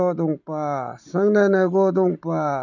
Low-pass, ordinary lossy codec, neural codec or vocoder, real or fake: 7.2 kHz; none; none; real